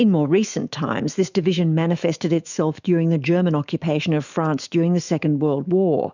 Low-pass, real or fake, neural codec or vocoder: 7.2 kHz; fake; autoencoder, 48 kHz, 128 numbers a frame, DAC-VAE, trained on Japanese speech